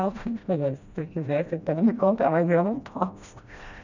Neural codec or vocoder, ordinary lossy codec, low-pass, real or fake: codec, 16 kHz, 1 kbps, FreqCodec, smaller model; none; 7.2 kHz; fake